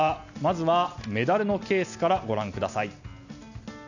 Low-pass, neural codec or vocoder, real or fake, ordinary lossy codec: 7.2 kHz; none; real; none